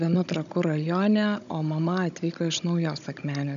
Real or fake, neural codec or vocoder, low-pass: fake; codec, 16 kHz, 16 kbps, FunCodec, trained on Chinese and English, 50 frames a second; 7.2 kHz